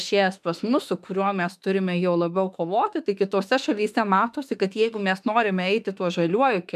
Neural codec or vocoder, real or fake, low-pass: autoencoder, 48 kHz, 32 numbers a frame, DAC-VAE, trained on Japanese speech; fake; 14.4 kHz